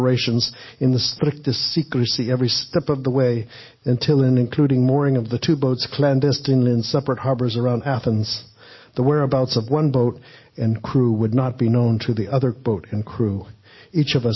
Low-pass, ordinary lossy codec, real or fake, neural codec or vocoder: 7.2 kHz; MP3, 24 kbps; real; none